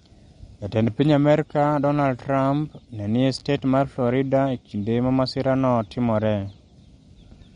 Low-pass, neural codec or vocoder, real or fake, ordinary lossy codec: 9.9 kHz; none; real; MP3, 48 kbps